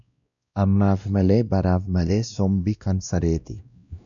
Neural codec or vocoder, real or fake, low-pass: codec, 16 kHz, 1 kbps, X-Codec, WavLM features, trained on Multilingual LibriSpeech; fake; 7.2 kHz